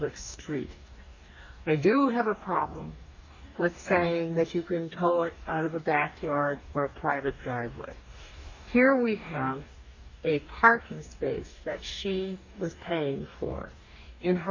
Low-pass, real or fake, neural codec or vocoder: 7.2 kHz; fake; codec, 44.1 kHz, 2.6 kbps, DAC